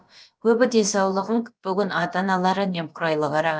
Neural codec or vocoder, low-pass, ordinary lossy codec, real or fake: codec, 16 kHz, about 1 kbps, DyCAST, with the encoder's durations; none; none; fake